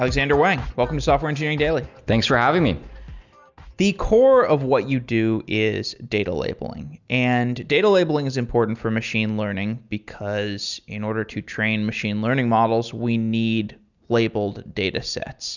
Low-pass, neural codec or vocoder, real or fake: 7.2 kHz; none; real